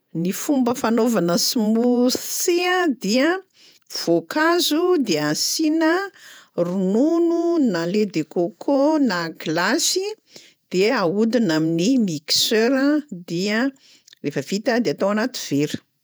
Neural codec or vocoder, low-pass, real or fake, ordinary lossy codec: vocoder, 48 kHz, 128 mel bands, Vocos; none; fake; none